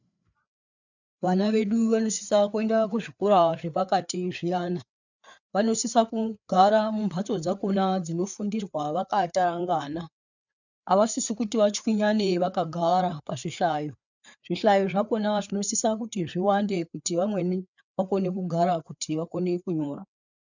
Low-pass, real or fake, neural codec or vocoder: 7.2 kHz; fake; codec, 16 kHz, 4 kbps, FreqCodec, larger model